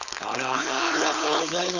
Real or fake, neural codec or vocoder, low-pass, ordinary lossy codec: fake; codec, 16 kHz, 4.8 kbps, FACodec; 7.2 kHz; none